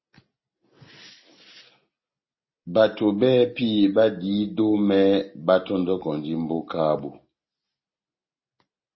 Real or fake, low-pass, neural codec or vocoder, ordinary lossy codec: real; 7.2 kHz; none; MP3, 24 kbps